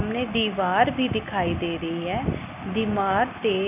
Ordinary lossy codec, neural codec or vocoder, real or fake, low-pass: none; none; real; 3.6 kHz